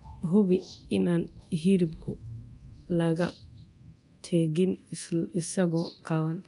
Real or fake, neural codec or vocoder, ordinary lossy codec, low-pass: fake; codec, 24 kHz, 0.9 kbps, DualCodec; none; 10.8 kHz